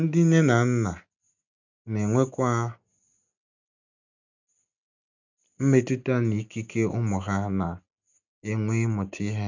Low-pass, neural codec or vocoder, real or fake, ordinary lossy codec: 7.2 kHz; none; real; none